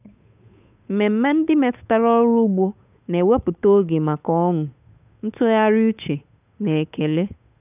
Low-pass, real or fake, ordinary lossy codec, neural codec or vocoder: 3.6 kHz; fake; none; codec, 16 kHz, 8 kbps, FunCodec, trained on Chinese and English, 25 frames a second